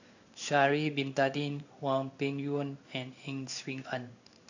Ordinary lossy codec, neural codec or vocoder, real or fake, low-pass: none; codec, 16 kHz in and 24 kHz out, 1 kbps, XY-Tokenizer; fake; 7.2 kHz